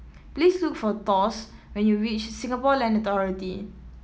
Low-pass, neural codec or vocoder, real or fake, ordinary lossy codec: none; none; real; none